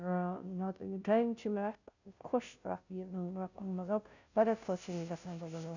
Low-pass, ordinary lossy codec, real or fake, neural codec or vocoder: 7.2 kHz; none; fake; codec, 16 kHz, 0.5 kbps, FunCodec, trained on Chinese and English, 25 frames a second